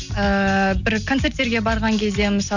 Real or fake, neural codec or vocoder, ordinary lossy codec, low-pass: real; none; none; 7.2 kHz